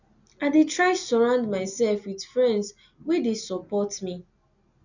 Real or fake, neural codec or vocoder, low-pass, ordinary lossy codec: real; none; 7.2 kHz; none